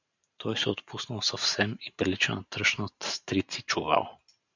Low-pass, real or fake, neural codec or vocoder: 7.2 kHz; fake; vocoder, 44.1 kHz, 128 mel bands every 512 samples, BigVGAN v2